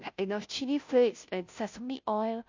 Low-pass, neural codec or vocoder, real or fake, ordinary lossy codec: 7.2 kHz; codec, 16 kHz, 0.5 kbps, FunCodec, trained on Chinese and English, 25 frames a second; fake; MP3, 48 kbps